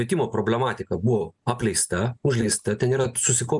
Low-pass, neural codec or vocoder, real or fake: 10.8 kHz; none; real